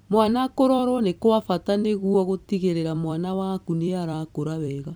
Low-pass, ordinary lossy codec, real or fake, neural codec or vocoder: none; none; fake; vocoder, 44.1 kHz, 128 mel bands every 256 samples, BigVGAN v2